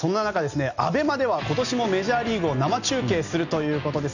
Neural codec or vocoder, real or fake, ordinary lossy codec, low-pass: none; real; none; 7.2 kHz